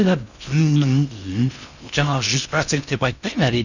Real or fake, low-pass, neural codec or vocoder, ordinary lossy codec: fake; 7.2 kHz; codec, 16 kHz in and 24 kHz out, 0.6 kbps, FocalCodec, streaming, 4096 codes; none